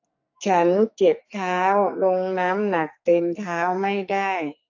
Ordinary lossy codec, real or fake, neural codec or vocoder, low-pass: AAC, 48 kbps; fake; codec, 44.1 kHz, 2.6 kbps, SNAC; 7.2 kHz